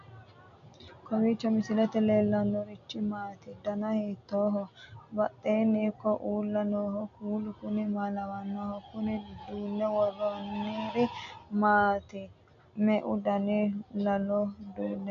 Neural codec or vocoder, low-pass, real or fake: none; 7.2 kHz; real